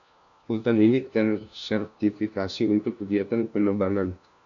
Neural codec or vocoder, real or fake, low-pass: codec, 16 kHz, 1 kbps, FunCodec, trained on LibriTTS, 50 frames a second; fake; 7.2 kHz